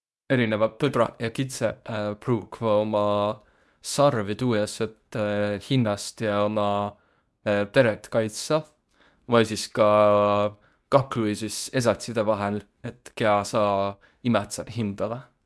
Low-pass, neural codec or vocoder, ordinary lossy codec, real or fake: none; codec, 24 kHz, 0.9 kbps, WavTokenizer, medium speech release version 2; none; fake